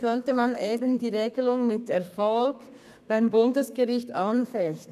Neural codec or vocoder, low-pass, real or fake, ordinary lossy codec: codec, 32 kHz, 1.9 kbps, SNAC; 14.4 kHz; fake; none